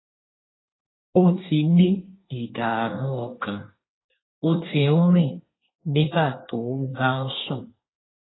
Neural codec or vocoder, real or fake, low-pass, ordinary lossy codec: codec, 24 kHz, 1 kbps, SNAC; fake; 7.2 kHz; AAC, 16 kbps